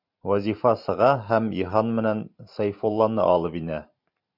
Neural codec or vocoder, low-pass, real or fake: vocoder, 44.1 kHz, 128 mel bands every 512 samples, BigVGAN v2; 5.4 kHz; fake